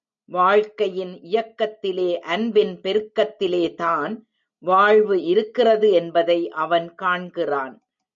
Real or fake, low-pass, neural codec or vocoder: real; 7.2 kHz; none